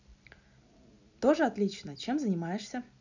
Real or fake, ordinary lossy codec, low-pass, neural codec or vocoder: real; none; 7.2 kHz; none